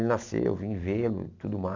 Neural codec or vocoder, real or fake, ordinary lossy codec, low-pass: none; real; AAC, 48 kbps; 7.2 kHz